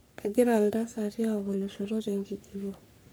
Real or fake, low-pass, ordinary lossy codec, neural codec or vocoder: fake; none; none; codec, 44.1 kHz, 3.4 kbps, Pupu-Codec